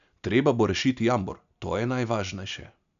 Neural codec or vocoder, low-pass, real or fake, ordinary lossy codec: none; 7.2 kHz; real; none